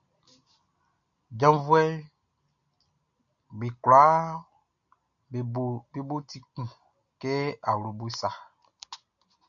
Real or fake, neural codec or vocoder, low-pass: real; none; 7.2 kHz